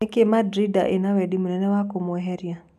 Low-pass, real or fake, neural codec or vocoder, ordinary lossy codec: 14.4 kHz; real; none; none